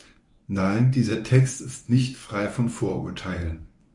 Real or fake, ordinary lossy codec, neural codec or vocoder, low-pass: fake; MP3, 64 kbps; codec, 24 kHz, 0.9 kbps, WavTokenizer, medium speech release version 1; 10.8 kHz